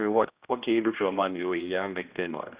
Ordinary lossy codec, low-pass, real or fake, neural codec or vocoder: Opus, 64 kbps; 3.6 kHz; fake; codec, 16 kHz, 1 kbps, X-Codec, HuBERT features, trained on general audio